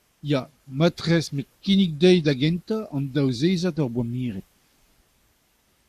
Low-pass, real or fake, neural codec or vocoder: 14.4 kHz; fake; codec, 44.1 kHz, 7.8 kbps, Pupu-Codec